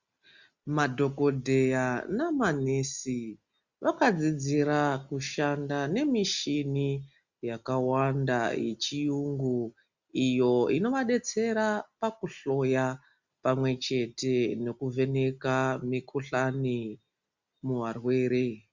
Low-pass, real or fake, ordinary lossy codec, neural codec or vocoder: 7.2 kHz; real; Opus, 64 kbps; none